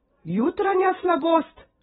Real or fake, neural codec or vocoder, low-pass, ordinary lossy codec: fake; vocoder, 44.1 kHz, 128 mel bands every 256 samples, BigVGAN v2; 19.8 kHz; AAC, 16 kbps